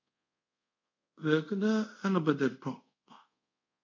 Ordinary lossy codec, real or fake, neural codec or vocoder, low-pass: MP3, 64 kbps; fake; codec, 24 kHz, 0.5 kbps, DualCodec; 7.2 kHz